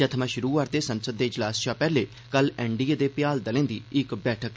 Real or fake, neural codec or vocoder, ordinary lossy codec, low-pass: real; none; none; none